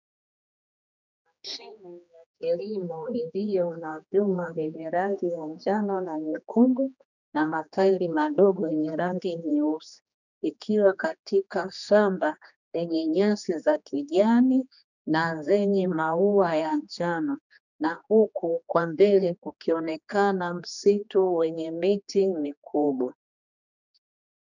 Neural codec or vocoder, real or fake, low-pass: codec, 16 kHz, 2 kbps, X-Codec, HuBERT features, trained on general audio; fake; 7.2 kHz